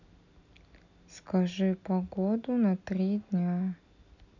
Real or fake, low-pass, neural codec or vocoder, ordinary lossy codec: real; 7.2 kHz; none; none